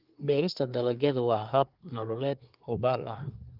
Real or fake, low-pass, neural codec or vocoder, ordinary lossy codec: fake; 5.4 kHz; codec, 24 kHz, 1 kbps, SNAC; Opus, 32 kbps